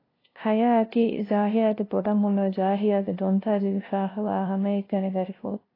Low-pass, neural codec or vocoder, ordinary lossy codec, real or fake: 5.4 kHz; codec, 16 kHz, 0.5 kbps, FunCodec, trained on LibriTTS, 25 frames a second; AAC, 24 kbps; fake